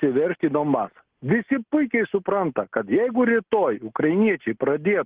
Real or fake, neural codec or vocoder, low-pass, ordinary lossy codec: real; none; 3.6 kHz; Opus, 16 kbps